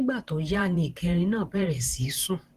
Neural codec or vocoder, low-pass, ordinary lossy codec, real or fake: vocoder, 44.1 kHz, 128 mel bands every 512 samples, BigVGAN v2; 14.4 kHz; Opus, 16 kbps; fake